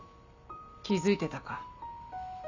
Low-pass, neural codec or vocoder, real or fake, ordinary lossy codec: 7.2 kHz; none; real; none